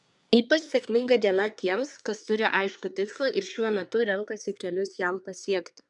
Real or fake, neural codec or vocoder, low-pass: fake; codec, 24 kHz, 1 kbps, SNAC; 10.8 kHz